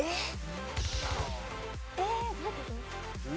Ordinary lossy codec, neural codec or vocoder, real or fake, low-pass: none; none; real; none